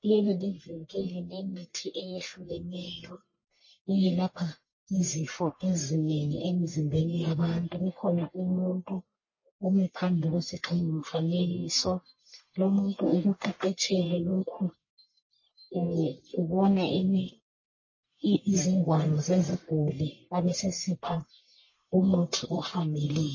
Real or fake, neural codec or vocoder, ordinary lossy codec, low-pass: fake; codec, 44.1 kHz, 1.7 kbps, Pupu-Codec; MP3, 32 kbps; 7.2 kHz